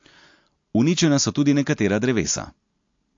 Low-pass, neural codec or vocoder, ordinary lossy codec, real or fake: 7.2 kHz; none; MP3, 48 kbps; real